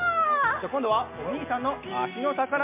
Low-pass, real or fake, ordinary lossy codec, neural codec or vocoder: 3.6 kHz; real; MP3, 32 kbps; none